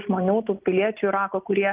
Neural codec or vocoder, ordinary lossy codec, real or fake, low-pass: none; Opus, 32 kbps; real; 3.6 kHz